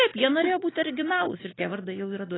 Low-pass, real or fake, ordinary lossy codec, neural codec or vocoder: 7.2 kHz; real; AAC, 16 kbps; none